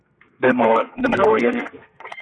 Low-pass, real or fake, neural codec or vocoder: 9.9 kHz; fake; codec, 32 kHz, 1.9 kbps, SNAC